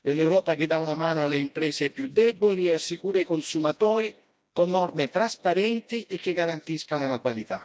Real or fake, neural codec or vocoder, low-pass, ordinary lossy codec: fake; codec, 16 kHz, 1 kbps, FreqCodec, smaller model; none; none